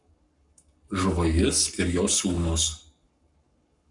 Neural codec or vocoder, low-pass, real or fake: codec, 44.1 kHz, 7.8 kbps, Pupu-Codec; 10.8 kHz; fake